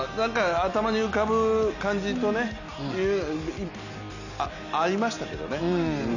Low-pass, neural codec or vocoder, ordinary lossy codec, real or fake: 7.2 kHz; none; none; real